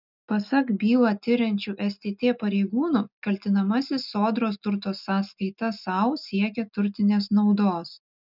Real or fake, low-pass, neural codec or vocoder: real; 5.4 kHz; none